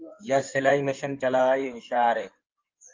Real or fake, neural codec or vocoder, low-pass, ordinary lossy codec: fake; codec, 16 kHz in and 24 kHz out, 2.2 kbps, FireRedTTS-2 codec; 7.2 kHz; Opus, 24 kbps